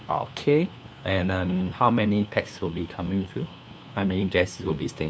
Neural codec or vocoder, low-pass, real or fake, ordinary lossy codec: codec, 16 kHz, 2 kbps, FunCodec, trained on LibriTTS, 25 frames a second; none; fake; none